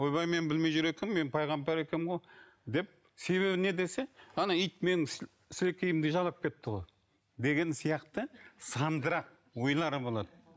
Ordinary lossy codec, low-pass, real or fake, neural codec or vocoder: none; none; real; none